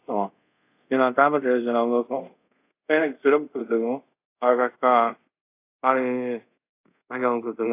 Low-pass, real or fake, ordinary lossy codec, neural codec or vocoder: 3.6 kHz; fake; AAC, 24 kbps; codec, 24 kHz, 0.5 kbps, DualCodec